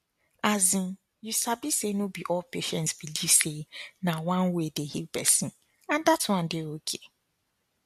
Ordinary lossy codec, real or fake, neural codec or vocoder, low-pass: MP3, 64 kbps; real; none; 14.4 kHz